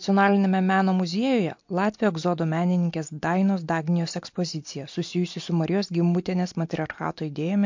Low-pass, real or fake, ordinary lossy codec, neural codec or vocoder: 7.2 kHz; real; MP3, 48 kbps; none